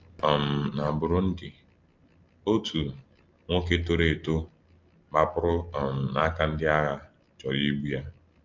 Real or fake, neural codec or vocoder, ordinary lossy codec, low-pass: real; none; Opus, 24 kbps; 7.2 kHz